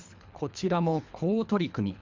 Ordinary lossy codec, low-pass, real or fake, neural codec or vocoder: MP3, 64 kbps; 7.2 kHz; fake; codec, 24 kHz, 3 kbps, HILCodec